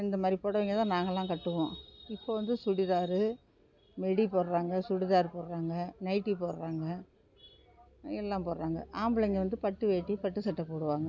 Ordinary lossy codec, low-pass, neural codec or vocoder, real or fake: none; 7.2 kHz; none; real